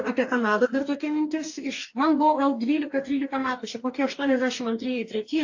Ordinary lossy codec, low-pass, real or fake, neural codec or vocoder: AAC, 48 kbps; 7.2 kHz; fake; codec, 44.1 kHz, 2.6 kbps, DAC